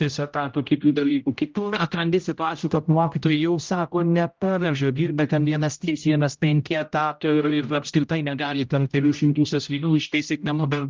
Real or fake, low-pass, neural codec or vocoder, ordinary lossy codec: fake; 7.2 kHz; codec, 16 kHz, 0.5 kbps, X-Codec, HuBERT features, trained on general audio; Opus, 24 kbps